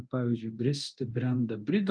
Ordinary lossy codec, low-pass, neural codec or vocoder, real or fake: Opus, 32 kbps; 9.9 kHz; codec, 24 kHz, 0.9 kbps, DualCodec; fake